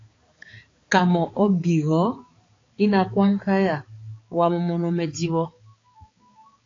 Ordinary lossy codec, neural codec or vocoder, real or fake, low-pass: AAC, 32 kbps; codec, 16 kHz, 4 kbps, X-Codec, HuBERT features, trained on balanced general audio; fake; 7.2 kHz